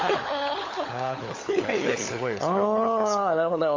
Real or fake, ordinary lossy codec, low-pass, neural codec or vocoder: fake; MP3, 32 kbps; 7.2 kHz; codec, 16 kHz, 16 kbps, FunCodec, trained on LibriTTS, 50 frames a second